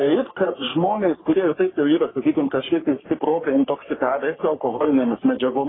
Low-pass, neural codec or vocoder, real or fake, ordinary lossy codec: 7.2 kHz; codec, 44.1 kHz, 3.4 kbps, Pupu-Codec; fake; AAC, 16 kbps